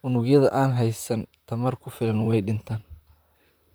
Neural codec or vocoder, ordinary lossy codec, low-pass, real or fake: vocoder, 44.1 kHz, 128 mel bands, Pupu-Vocoder; none; none; fake